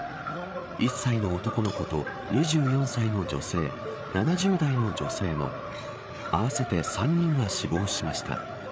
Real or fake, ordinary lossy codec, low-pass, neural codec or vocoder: fake; none; none; codec, 16 kHz, 8 kbps, FreqCodec, larger model